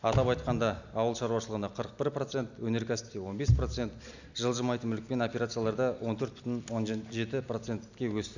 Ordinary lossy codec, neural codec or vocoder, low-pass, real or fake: none; none; 7.2 kHz; real